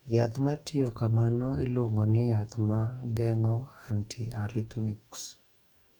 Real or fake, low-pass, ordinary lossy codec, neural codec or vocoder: fake; 19.8 kHz; none; codec, 44.1 kHz, 2.6 kbps, DAC